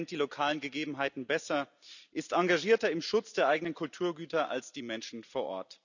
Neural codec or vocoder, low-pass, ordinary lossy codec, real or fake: none; 7.2 kHz; none; real